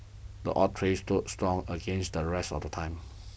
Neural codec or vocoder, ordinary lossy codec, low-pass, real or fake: none; none; none; real